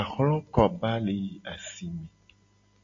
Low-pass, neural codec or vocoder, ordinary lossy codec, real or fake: 7.2 kHz; none; AAC, 48 kbps; real